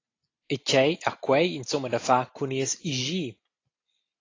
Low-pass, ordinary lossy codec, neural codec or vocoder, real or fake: 7.2 kHz; AAC, 32 kbps; none; real